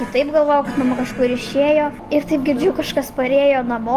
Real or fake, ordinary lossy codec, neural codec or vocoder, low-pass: real; Opus, 24 kbps; none; 14.4 kHz